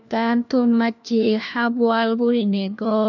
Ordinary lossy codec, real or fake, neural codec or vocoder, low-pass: none; fake; codec, 16 kHz, 1 kbps, FunCodec, trained on LibriTTS, 50 frames a second; 7.2 kHz